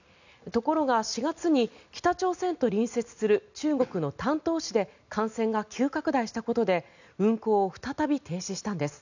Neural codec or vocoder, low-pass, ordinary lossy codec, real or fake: none; 7.2 kHz; none; real